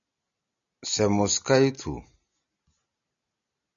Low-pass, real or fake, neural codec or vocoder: 7.2 kHz; real; none